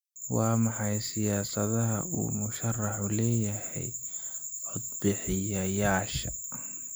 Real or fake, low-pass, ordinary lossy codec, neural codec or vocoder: real; none; none; none